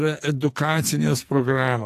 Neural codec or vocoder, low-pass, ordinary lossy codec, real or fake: codec, 44.1 kHz, 2.6 kbps, SNAC; 14.4 kHz; AAC, 64 kbps; fake